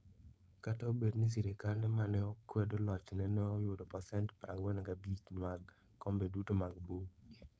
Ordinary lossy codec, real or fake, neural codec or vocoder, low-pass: none; fake; codec, 16 kHz, 4.8 kbps, FACodec; none